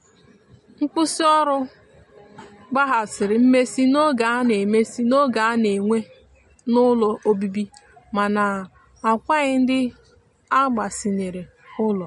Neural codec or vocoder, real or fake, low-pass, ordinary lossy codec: none; real; 14.4 kHz; MP3, 48 kbps